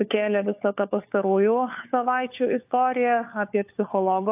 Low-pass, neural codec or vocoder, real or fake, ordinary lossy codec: 3.6 kHz; codec, 16 kHz, 4 kbps, FunCodec, trained on LibriTTS, 50 frames a second; fake; AAC, 32 kbps